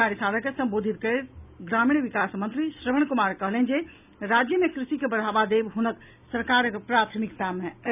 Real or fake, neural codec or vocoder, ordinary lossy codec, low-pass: real; none; none; 3.6 kHz